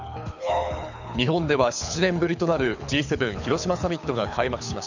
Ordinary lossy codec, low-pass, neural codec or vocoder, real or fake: none; 7.2 kHz; codec, 24 kHz, 6 kbps, HILCodec; fake